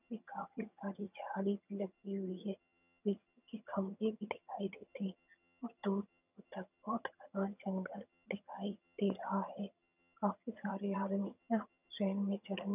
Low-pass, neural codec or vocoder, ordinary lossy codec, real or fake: 3.6 kHz; vocoder, 22.05 kHz, 80 mel bands, HiFi-GAN; none; fake